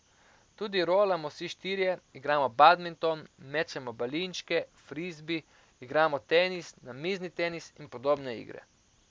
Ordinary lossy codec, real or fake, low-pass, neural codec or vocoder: none; real; none; none